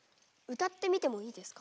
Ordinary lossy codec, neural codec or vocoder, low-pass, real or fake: none; none; none; real